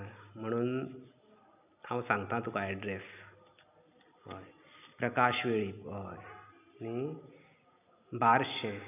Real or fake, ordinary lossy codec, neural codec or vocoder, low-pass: real; none; none; 3.6 kHz